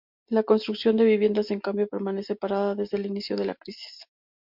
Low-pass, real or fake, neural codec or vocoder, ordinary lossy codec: 5.4 kHz; real; none; MP3, 48 kbps